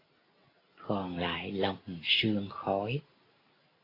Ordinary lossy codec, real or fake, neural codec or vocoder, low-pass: AAC, 24 kbps; real; none; 5.4 kHz